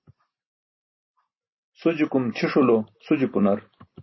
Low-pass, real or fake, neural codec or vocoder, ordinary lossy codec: 7.2 kHz; real; none; MP3, 24 kbps